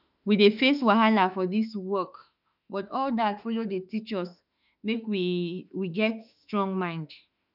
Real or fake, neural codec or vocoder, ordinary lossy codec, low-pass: fake; autoencoder, 48 kHz, 32 numbers a frame, DAC-VAE, trained on Japanese speech; none; 5.4 kHz